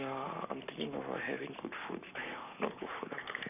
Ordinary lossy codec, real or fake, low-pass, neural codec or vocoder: none; fake; 3.6 kHz; codec, 44.1 kHz, 7.8 kbps, Pupu-Codec